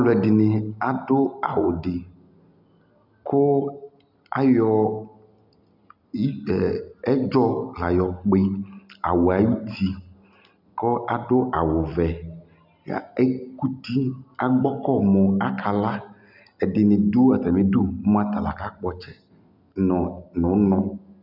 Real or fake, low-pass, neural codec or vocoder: real; 5.4 kHz; none